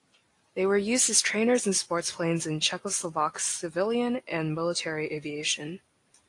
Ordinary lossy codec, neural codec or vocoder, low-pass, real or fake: AAC, 48 kbps; none; 10.8 kHz; real